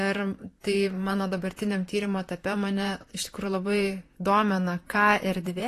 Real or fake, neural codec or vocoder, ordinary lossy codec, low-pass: fake; vocoder, 44.1 kHz, 128 mel bands, Pupu-Vocoder; AAC, 48 kbps; 14.4 kHz